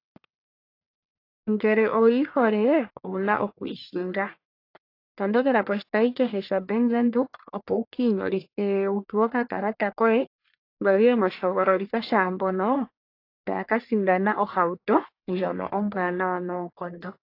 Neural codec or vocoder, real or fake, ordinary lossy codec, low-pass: codec, 44.1 kHz, 1.7 kbps, Pupu-Codec; fake; AAC, 32 kbps; 5.4 kHz